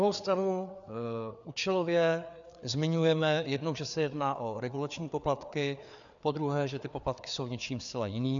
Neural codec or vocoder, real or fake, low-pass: codec, 16 kHz, 4 kbps, FreqCodec, larger model; fake; 7.2 kHz